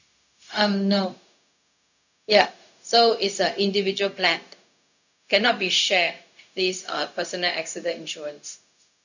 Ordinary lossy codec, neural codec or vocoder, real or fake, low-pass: none; codec, 16 kHz, 0.4 kbps, LongCat-Audio-Codec; fake; 7.2 kHz